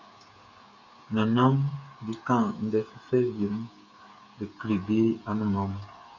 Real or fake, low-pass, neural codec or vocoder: fake; 7.2 kHz; codec, 16 kHz, 8 kbps, FreqCodec, smaller model